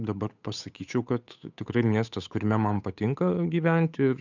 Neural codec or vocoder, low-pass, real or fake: codec, 16 kHz, 8 kbps, FunCodec, trained on Chinese and English, 25 frames a second; 7.2 kHz; fake